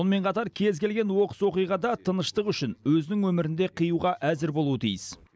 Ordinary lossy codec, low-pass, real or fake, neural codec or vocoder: none; none; real; none